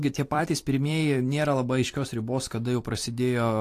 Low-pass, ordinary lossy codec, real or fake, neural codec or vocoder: 14.4 kHz; AAC, 64 kbps; fake; vocoder, 44.1 kHz, 128 mel bands every 256 samples, BigVGAN v2